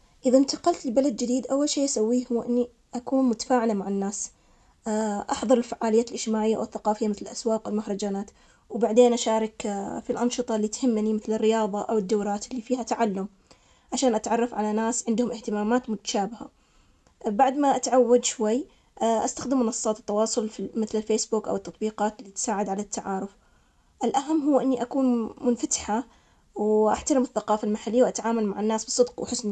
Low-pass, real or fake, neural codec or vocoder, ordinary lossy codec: none; real; none; none